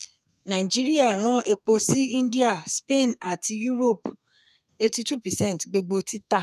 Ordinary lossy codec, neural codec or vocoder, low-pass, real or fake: none; codec, 32 kHz, 1.9 kbps, SNAC; 14.4 kHz; fake